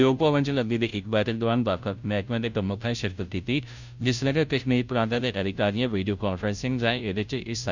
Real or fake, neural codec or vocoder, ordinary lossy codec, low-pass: fake; codec, 16 kHz, 0.5 kbps, FunCodec, trained on Chinese and English, 25 frames a second; none; 7.2 kHz